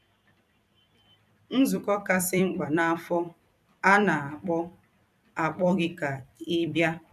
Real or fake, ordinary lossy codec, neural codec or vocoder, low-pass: fake; none; vocoder, 44.1 kHz, 128 mel bands every 256 samples, BigVGAN v2; 14.4 kHz